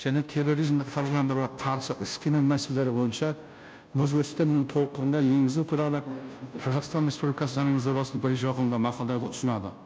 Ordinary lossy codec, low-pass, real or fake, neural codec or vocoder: none; none; fake; codec, 16 kHz, 0.5 kbps, FunCodec, trained on Chinese and English, 25 frames a second